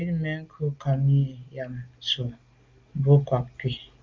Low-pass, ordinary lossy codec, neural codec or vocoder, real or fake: 7.2 kHz; Opus, 32 kbps; none; real